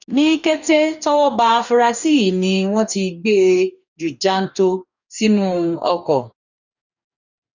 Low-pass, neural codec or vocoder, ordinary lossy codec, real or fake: 7.2 kHz; codec, 44.1 kHz, 2.6 kbps, DAC; none; fake